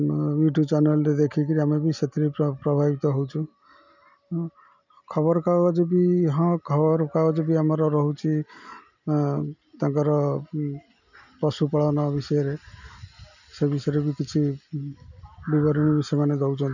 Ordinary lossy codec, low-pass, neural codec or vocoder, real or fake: none; 7.2 kHz; none; real